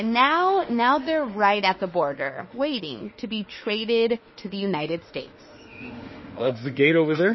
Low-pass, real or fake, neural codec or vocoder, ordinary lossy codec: 7.2 kHz; fake; autoencoder, 48 kHz, 32 numbers a frame, DAC-VAE, trained on Japanese speech; MP3, 24 kbps